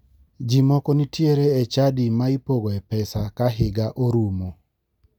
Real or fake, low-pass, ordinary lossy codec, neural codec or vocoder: real; 19.8 kHz; none; none